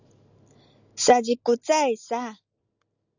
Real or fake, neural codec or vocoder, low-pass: real; none; 7.2 kHz